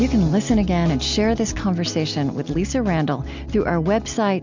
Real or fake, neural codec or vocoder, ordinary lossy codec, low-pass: real; none; MP3, 64 kbps; 7.2 kHz